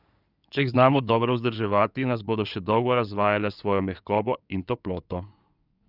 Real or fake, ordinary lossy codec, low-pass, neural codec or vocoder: fake; none; 5.4 kHz; codec, 16 kHz in and 24 kHz out, 2.2 kbps, FireRedTTS-2 codec